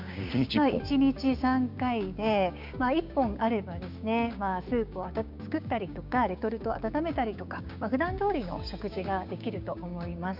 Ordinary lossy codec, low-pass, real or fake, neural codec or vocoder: none; 5.4 kHz; fake; codec, 16 kHz, 6 kbps, DAC